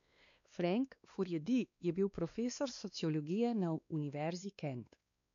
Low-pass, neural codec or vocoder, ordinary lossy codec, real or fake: 7.2 kHz; codec, 16 kHz, 2 kbps, X-Codec, WavLM features, trained on Multilingual LibriSpeech; none; fake